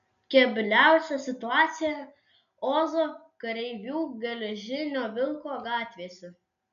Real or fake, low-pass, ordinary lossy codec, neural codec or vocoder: real; 7.2 kHz; MP3, 96 kbps; none